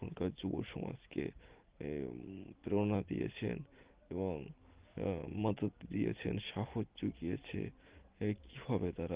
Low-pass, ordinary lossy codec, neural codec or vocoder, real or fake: 3.6 kHz; Opus, 16 kbps; none; real